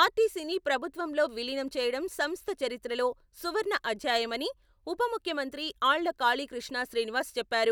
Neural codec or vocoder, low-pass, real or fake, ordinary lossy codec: none; none; real; none